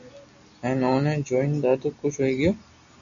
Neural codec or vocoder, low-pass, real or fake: none; 7.2 kHz; real